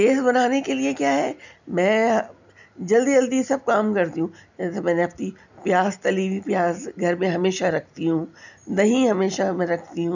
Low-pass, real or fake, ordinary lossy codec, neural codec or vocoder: 7.2 kHz; real; none; none